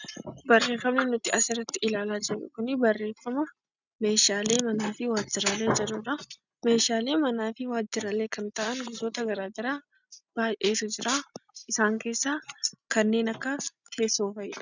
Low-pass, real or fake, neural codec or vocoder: 7.2 kHz; real; none